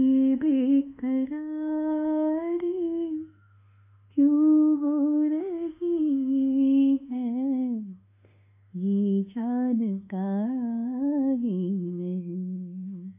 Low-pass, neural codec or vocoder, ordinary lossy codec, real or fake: 3.6 kHz; autoencoder, 48 kHz, 32 numbers a frame, DAC-VAE, trained on Japanese speech; AAC, 32 kbps; fake